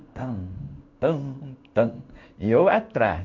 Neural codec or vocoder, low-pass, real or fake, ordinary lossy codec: codec, 16 kHz in and 24 kHz out, 1 kbps, XY-Tokenizer; 7.2 kHz; fake; MP3, 48 kbps